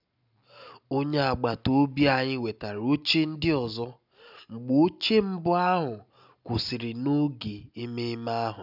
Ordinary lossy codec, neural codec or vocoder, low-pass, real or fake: none; none; 5.4 kHz; real